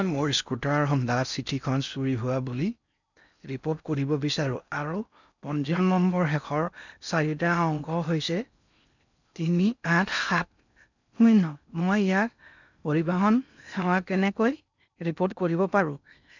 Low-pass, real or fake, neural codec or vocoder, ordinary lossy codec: 7.2 kHz; fake; codec, 16 kHz in and 24 kHz out, 0.6 kbps, FocalCodec, streaming, 4096 codes; none